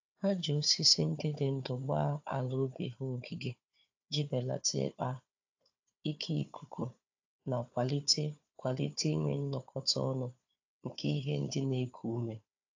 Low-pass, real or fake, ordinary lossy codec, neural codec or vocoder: 7.2 kHz; fake; AAC, 48 kbps; codec, 16 kHz, 4 kbps, FunCodec, trained on Chinese and English, 50 frames a second